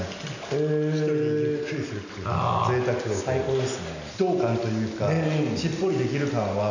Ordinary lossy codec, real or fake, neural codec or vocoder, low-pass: none; real; none; 7.2 kHz